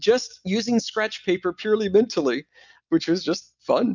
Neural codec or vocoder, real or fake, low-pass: none; real; 7.2 kHz